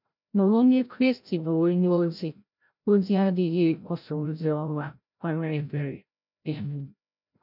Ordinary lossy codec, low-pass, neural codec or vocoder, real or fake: none; 5.4 kHz; codec, 16 kHz, 0.5 kbps, FreqCodec, larger model; fake